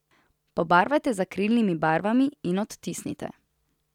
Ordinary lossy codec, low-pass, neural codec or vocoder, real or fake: none; 19.8 kHz; none; real